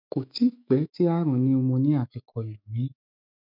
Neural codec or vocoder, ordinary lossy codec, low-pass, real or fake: autoencoder, 48 kHz, 128 numbers a frame, DAC-VAE, trained on Japanese speech; none; 5.4 kHz; fake